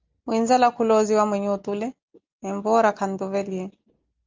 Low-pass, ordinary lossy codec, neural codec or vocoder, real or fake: 7.2 kHz; Opus, 32 kbps; none; real